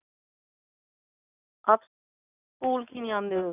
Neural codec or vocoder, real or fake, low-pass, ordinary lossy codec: none; real; 3.6 kHz; none